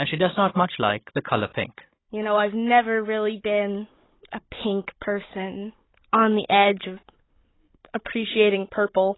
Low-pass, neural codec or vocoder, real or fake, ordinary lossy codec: 7.2 kHz; codec, 16 kHz, 16 kbps, FunCodec, trained on Chinese and English, 50 frames a second; fake; AAC, 16 kbps